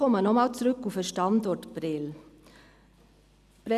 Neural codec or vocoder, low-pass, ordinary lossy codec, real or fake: none; 14.4 kHz; none; real